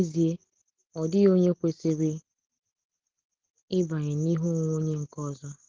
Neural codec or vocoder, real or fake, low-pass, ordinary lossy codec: none; real; 7.2 kHz; Opus, 16 kbps